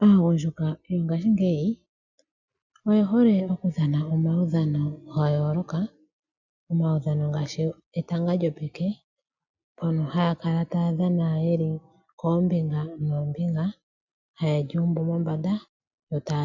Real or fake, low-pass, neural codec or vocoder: real; 7.2 kHz; none